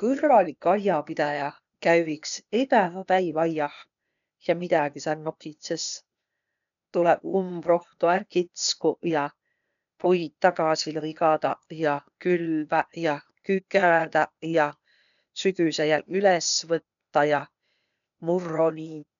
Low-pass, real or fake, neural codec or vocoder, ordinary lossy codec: 7.2 kHz; fake; codec, 16 kHz, 0.8 kbps, ZipCodec; none